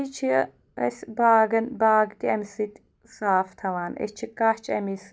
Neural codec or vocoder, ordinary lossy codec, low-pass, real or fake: none; none; none; real